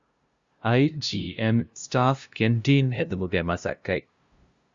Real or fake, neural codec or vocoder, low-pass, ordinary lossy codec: fake; codec, 16 kHz, 0.5 kbps, FunCodec, trained on LibriTTS, 25 frames a second; 7.2 kHz; Opus, 64 kbps